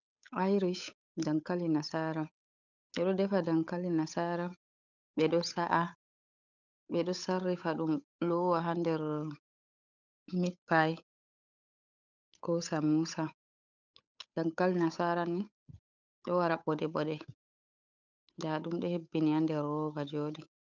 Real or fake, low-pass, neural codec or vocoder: fake; 7.2 kHz; codec, 16 kHz, 8 kbps, FunCodec, trained on Chinese and English, 25 frames a second